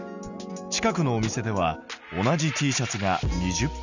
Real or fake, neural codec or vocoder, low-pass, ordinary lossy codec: real; none; 7.2 kHz; none